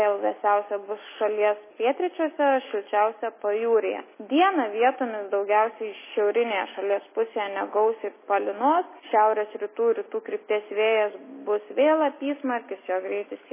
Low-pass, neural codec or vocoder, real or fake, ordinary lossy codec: 3.6 kHz; none; real; MP3, 16 kbps